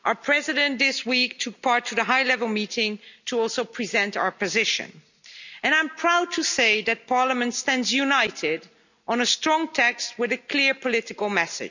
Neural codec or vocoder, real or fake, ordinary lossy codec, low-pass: none; real; none; 7.2 kHz